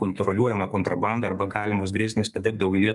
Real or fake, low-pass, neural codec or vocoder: fake; 10.8 kHz; codec, 32 kHz, 1.9 kbps, SNAC